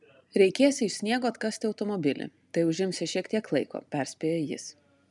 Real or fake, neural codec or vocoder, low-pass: real; none; 10.8 kHz